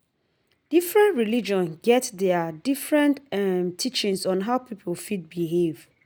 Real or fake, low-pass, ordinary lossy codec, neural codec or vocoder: real; none; none; none